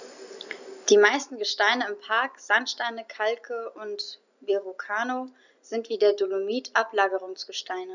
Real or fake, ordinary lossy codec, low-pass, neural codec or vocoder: real; none; 7.2 kHz; none